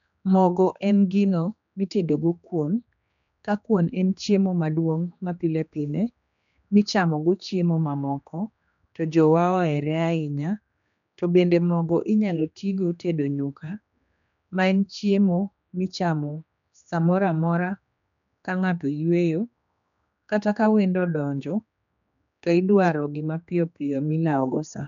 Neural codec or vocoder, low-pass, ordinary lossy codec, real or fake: codec, 16 kHz, 2 kbps, X-Codec, HuBERT features, trained on general audio; 7.2 kHz; none; fake